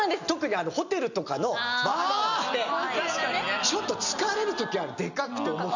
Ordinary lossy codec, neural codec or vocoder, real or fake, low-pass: none; none; real; 7.2 kHz